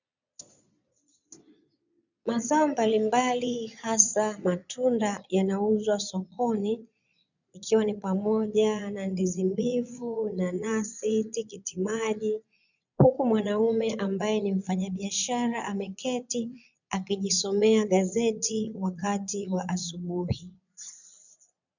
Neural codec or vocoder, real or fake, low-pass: vocoder, 22.05 kHz, 80 mel bands, Vocos; fake; 7.2 kHz